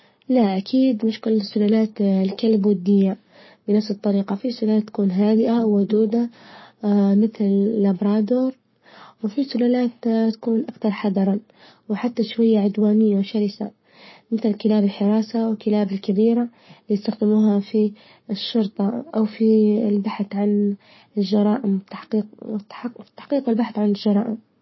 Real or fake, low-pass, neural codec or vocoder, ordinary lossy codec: fake; 7.2 kHz; codec, 44.1 kHz, 7.8 kbps, Pupu-Codec; MP3, 24 kbps